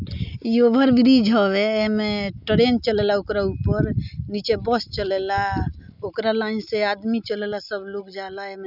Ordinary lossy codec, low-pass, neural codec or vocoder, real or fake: none; 5.4 kHz; none; real